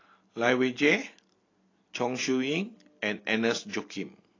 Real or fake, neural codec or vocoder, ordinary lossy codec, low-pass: real; none; AAC, 32 kbps; 7.2 kHz